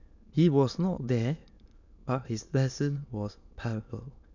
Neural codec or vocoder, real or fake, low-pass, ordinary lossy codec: autoencoder, 22.05 kHz, a latent of 192 numbers a frame, VITS, trained on many speakers; fake; 7.2 kHz; none